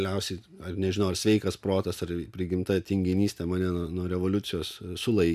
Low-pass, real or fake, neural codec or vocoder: 14.4 kHz; real; none